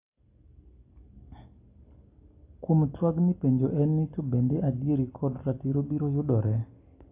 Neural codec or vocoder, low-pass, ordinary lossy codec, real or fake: none; 3.6 kHz; AAC, 24 kbps; real